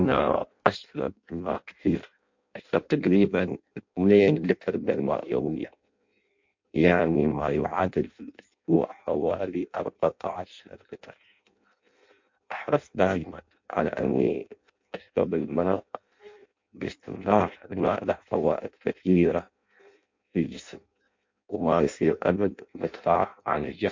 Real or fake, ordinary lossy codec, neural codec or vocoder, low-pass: fake; MP3, 48 kbps; codec, 16 kHz in and 24 kHz out, 0.6 kbps, FireRedTTS-2 codec; 7.2 kHz